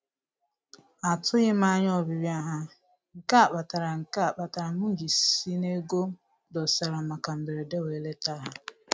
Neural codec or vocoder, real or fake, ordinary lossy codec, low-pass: none; real; none; none